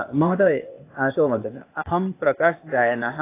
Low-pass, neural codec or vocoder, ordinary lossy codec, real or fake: 3.6 kHz; codec, 16 kHz, 0.8 kbps, ZipCodec; AAC, 24 kbps; fake